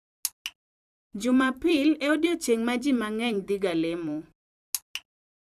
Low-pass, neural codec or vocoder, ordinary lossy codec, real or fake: 14.4 kHz; vocoder, 44.1 kHz, 128 mel bands every 256 samples, BigVGAN v2; none; fake